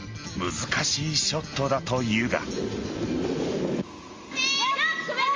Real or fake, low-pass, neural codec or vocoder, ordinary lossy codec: real; 7.2 kHz; none; Opus, 32 kbps